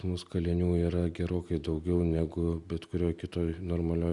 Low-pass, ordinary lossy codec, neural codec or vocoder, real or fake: 10.8 kHz; Opus, 64 kbps; none; real